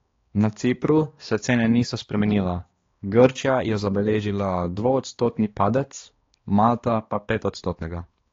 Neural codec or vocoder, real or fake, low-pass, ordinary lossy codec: codec, 16 kHz, 2 kbps, X-Codec, HuBERT features, trained on balanced general audio; fake; 7.2 kHz; AAC, 32 kbps